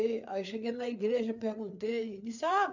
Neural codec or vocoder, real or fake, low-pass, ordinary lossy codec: codec, 16 kHz, 4 kbps, FreqCodec, larger model; fake; 7.2 kHz; none